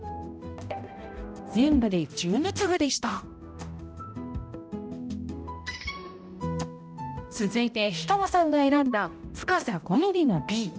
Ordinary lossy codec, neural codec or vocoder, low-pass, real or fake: none; codec, 16 kHz, 0.5 kbps, X-Codec, HuBERT features, trained on balanced general audio; none; fake